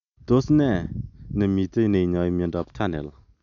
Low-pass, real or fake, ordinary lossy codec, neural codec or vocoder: 7.2 kHz; real; none; none